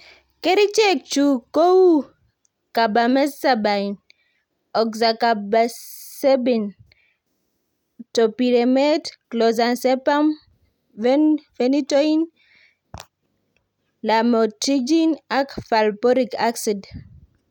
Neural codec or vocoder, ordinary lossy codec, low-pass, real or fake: none; none; 19.8 kHz; real